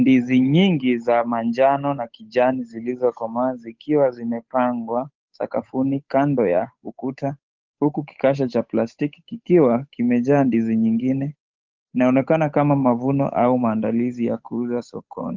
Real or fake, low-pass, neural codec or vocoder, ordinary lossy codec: fake; 7.2 kHz; codec, 16 kHz, 8 kbps, FunCodec, trained on Chinese and English, 25 frames a second; Opus, 32 kbps